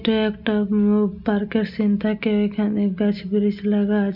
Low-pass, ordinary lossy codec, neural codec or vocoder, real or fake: 5.4 kHz; none; none; real